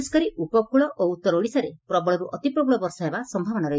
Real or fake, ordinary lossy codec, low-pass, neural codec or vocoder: real; none; none; none